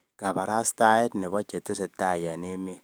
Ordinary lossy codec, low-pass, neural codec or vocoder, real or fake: none; none; codec, 44.1 kHz, 7.8 kbps, DAC; fake